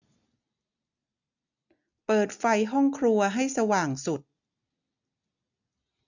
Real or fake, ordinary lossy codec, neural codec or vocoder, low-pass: real; none; none; 7.2 kHz